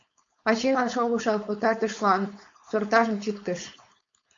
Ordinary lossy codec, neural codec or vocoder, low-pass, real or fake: MP3, 48 kbps; codec, 16 kHz, 4.8 kbps, FACodec; 7.2 kHz; fake